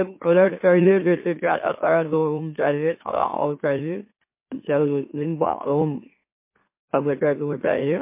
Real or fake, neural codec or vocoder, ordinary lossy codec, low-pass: fake; autoencoder, 44.1 kHz, a latent of 192 numbers a frame, MeloTTS; MP3, 24 kbps; 3.6 kHz